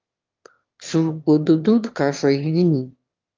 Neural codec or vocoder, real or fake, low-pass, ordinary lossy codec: autoencoder, 22.05 kHz, a latent of 192 numbers a frame, VITS, trained on one speaker; fake; 7.2 kHz; Opus, 32 kbps